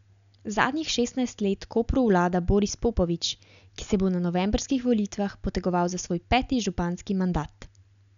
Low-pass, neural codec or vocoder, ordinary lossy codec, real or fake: 7.2 kHz; none; none; real